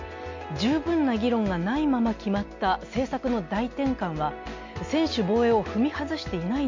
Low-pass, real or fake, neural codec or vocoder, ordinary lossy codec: 7.2 kHz; real; none; MP3, 48 kbps